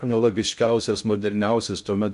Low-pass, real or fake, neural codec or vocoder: 10.8 kHz; fake; codec, 16 kHz in and 24 kHz out, 0.6 kbps, FocalCodec, streaming, 2048 codes